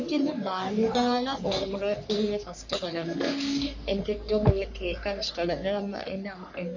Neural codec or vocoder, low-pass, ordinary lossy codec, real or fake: codec, 44.1 kHz, 3.4 kbps, Pupu-Codec; 7.2 kHz; none; fake